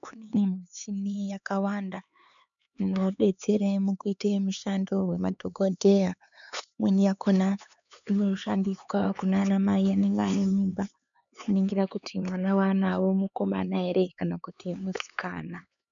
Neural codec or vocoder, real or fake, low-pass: codec, 16 kHz, 4 kbps, X-Codec, HuBERT features, trained on LibriSpeech; fake; 7.2 kHz